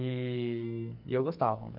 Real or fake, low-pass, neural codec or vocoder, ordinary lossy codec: fake; 5.4 kHz; codec, 44.1 kHz, 2.6 kbps, SNAC; none